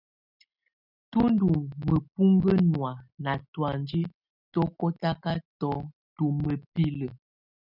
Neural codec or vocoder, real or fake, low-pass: none; real; 5.4 kHz